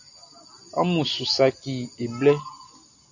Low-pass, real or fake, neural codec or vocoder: 7.2 kHz; real; none